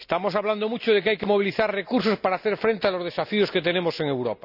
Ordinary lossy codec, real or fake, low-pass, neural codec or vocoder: none; real; 5.4 kHz; none